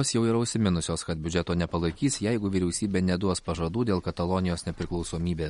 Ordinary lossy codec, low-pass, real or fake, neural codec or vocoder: MP3, 48 kbps; 10.8 kHz; real; none